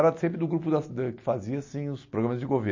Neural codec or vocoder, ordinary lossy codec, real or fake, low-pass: none; MP3, 32 kbps; real; 7.2 kHz